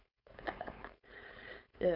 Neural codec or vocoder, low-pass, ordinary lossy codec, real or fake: codec, 16 kHz, 4.8 kbps, FACodec; 5.4 kHz; none; fake